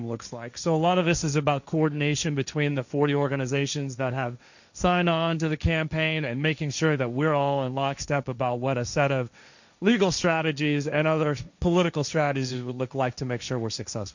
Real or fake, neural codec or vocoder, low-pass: fake; codec, 16 kHz, 1.1 kbps, Voila-Tokenizer; 7.2 kHz